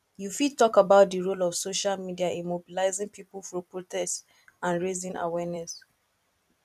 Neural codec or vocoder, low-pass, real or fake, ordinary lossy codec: vocoder, 44.1 kHz, 128 mel bands every 256 samples, BigVGAN v2; 14.4 kHz; fake; none